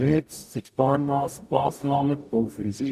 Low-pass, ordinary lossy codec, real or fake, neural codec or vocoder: 14.4 kHz; none; fake; codec, 44.1 kHz, 0.9 kbps, DAC